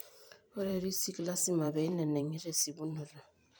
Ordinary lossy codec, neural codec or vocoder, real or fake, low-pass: none; vocoder, 44.1 kHz, 128 mel bands every 512 samples, BigVGAN v2; fake; none